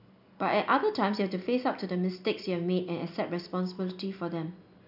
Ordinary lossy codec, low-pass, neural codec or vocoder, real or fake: AAC, 48 kbps; 5.4 kHz; none; real